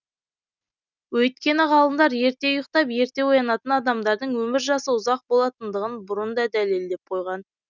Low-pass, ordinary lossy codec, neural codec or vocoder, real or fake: 7.2 kHz; none; none; real